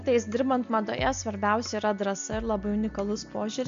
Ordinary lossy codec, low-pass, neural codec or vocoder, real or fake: MP3, 64 kbps; 7.2 kHz; none; real